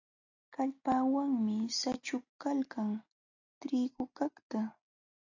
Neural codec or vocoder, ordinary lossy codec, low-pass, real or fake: none; AAC, 48 kbps; 7.2 kHz; real